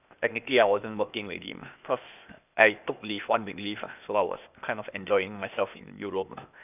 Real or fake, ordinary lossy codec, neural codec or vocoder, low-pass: fake; none; codec, 16 kHz, 0.8 kbps, ZipCodec; 3.6 kHz